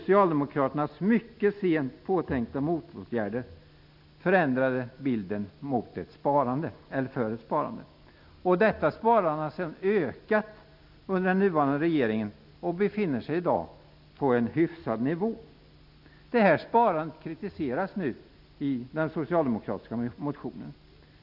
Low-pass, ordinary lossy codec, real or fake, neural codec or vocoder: 5.4 kHz; none; real; none